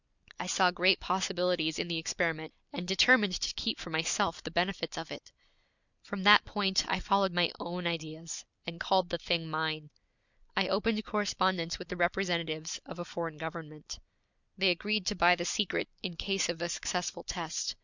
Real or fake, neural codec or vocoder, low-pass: real; none; 7.2 kHz